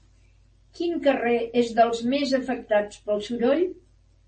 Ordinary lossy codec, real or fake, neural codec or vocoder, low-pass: MP3, 32 kbps; real; none; 9.9 kHz